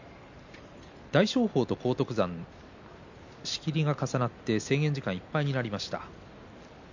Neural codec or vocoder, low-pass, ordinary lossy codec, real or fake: none; 7.2 kHz; none; real